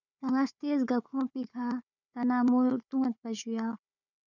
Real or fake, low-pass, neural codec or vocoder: fake; 7.2 kHz; codec, 16 kHz, 4 kbps, FunCodec, trained on Chinese and English, 50 frames a second